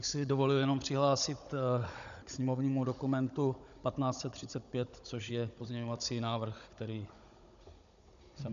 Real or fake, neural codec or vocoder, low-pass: fake; codec, 16 kHz, 16 kbps, FunCodec, trained on Chinese and English, 50 frames a second; 7.2 kHz